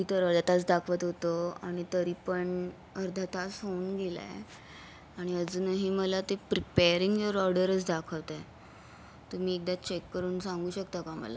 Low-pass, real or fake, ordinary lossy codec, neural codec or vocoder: none; real; none; none